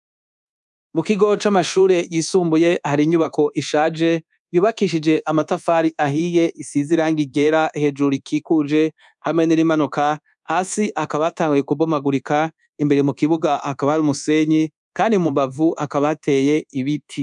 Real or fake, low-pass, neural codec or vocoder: fake; 10.8 kHz; codec, 24 kHz, 1.2 kbps, DualCodec